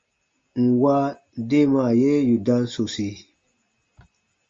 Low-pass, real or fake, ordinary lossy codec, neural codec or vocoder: 7.2 kHz; real; Opus, 32 kbps; none